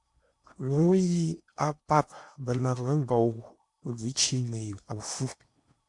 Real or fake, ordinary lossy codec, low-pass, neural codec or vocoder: fake; MP3, 64 kbps; 10.8 kHz; codec, 16 kHz in and 24 kHz out, 0.8 kbps, FocalCodec, streaming, 65536 codes